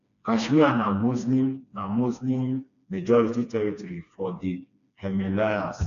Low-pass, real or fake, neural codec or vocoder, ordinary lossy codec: 7.2 kHz; fake; codec, 16 kHz, 2 kbps, FreqCodec, smaller model; none